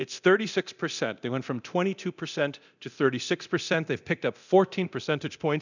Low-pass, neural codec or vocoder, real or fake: 7.2 kHz; codec, 24 kHz, 0.9 kbps, DualCodec; fake